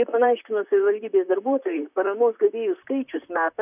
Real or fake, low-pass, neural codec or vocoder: fake; 3.6 kHz; vocoder, 44.1 kHz, 128 mel bands, Pupu-Vocoder